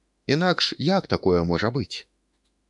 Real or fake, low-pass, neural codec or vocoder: fake; 10.8 kHz; autoencoder, 48 kHz, 32 numbers a frame, DAC-VAE, trained on Japanese speech